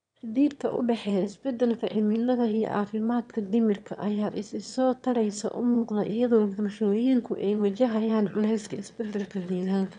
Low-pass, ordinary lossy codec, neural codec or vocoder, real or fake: 9.9 kHz; none; autoencoder, 22.05 kHz, a latent of 192 numbers a frame, VITS, trained on one speaker; fake